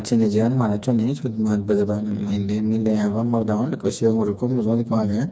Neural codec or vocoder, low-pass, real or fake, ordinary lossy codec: codec, 16 kHz, 2 kbps, FreqCodec, smaller model; none; fake; none